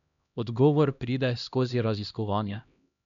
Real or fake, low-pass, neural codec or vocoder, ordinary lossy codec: fake; 7.2 kHz; codec, 16 kHz, 1 kbps, X-Codec, HuBERT features, trained on LibriSpeech; none